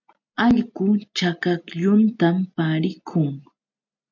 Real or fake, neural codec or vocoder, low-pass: real; none; 7.2 kHz